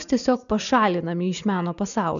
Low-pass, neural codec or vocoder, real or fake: 7.2 kHz; none; real